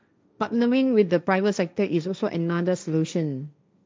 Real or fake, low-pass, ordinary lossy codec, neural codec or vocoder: fake; none; none; codec, 16 kHz, 1.1 kbps, Voila-Tokenizer